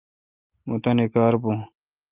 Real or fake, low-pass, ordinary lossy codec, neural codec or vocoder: real; 3.6 kHz; Opus, 24 kbps; none